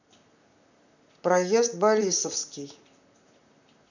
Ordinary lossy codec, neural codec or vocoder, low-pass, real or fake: none; vocoder, 44.1 kHz, 128 mel bands, Pupu-Vocoder; 7.2 kHz; fake